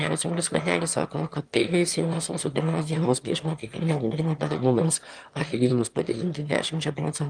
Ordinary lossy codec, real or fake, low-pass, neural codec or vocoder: Opus, 32 kbps; fake; 9.9 kHz; autoencoder, 22.05 kHz, a latent of 192 numbers a frame, VITS, trained on one speaker